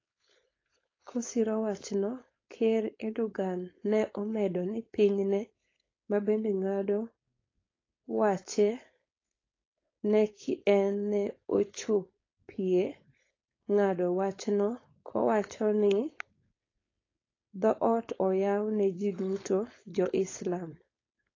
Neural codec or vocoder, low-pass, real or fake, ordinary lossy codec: codec, 16 kHz, 4.8 kbps, FACodec; 7.2 kHz; fake; AAC, 32 kbps